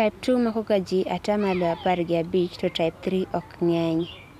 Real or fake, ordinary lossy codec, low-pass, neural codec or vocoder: real; none; 14.4 kHz; none